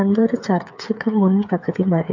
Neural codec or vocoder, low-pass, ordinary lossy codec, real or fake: codec, 16 kHz, 8 kbps, FreqCodec, smaller model; 7.2 kHz; MP3, 48 kbps; fake